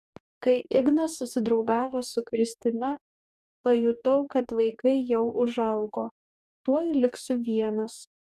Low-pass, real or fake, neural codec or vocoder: 14.4 kHz; fake; codec, 44.1 kHz, 2.6 kbps, DAC